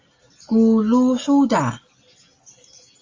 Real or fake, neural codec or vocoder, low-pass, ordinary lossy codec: real; none; 7.2 kHz; Opus, 32 kbps